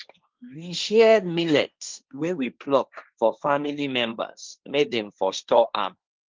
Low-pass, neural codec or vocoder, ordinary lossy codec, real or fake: 7.2 kHz; codec, 16 kHz, 1.1 kbps, Voila-Tokenizer; Opus, 24 kbps; fake